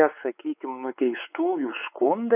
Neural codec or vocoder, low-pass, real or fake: codec, 16 kHz, 4 kbps, X-Codec, WavLM features, trained on Multilingual LibriSpeech; 3.6 kHz; fake